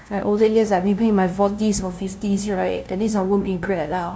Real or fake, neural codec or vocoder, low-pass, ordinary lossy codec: fake; codec, 16 kHz, 0.5 kbps, FunCodec, trained on LibriTTS, 25 frames a second; none; none